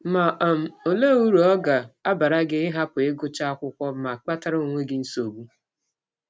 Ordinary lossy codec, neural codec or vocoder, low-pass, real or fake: none; none; none; real